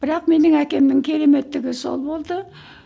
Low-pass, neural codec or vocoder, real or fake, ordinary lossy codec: none; none; real; none